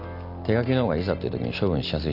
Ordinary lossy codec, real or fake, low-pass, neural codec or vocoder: none; real; 5.4 kHz; none